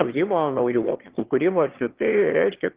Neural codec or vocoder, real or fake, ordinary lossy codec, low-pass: autoencoder, 22.05 kHz, a latent of 192 numbers a frame, VITS, trained on one speaker; fake; Opus, 16 kbps; 3.6 kHz